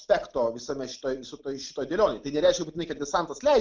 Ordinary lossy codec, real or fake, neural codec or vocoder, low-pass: Opus, 32 kbps; real; none; 7.2 kHz